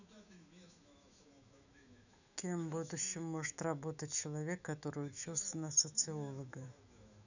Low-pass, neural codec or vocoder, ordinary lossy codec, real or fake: 7.2 kHz; autoencoder, 48 kHz, 128 numbers a frame, DAC-VAE, trained on Japanese speech; none; fake